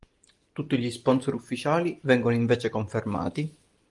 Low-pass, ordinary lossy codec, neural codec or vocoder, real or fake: 10.8 kHz; Opus, 32 kbps; none; real